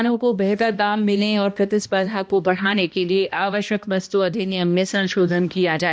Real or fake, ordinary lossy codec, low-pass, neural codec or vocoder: fake; none; none; codec, 16 kHz, 1 kbps, X-Codec, HuBERT features, trained on balanced general audio